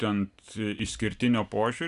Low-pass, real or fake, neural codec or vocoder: 10.8 kHz; real; none